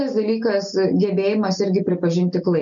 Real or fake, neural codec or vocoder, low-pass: real; none; 7.2 kHz